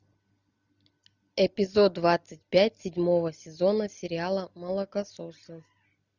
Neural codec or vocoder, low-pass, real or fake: none; 7.2 kHz; real